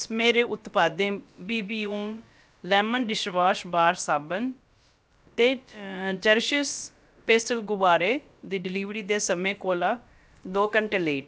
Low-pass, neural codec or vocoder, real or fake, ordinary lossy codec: none; codec, 16 kHz, about 1 kbps, DyCAST, with the encoder's durations; fake; none